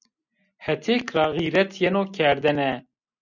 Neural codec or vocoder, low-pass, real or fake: none; 7.2 kHz; real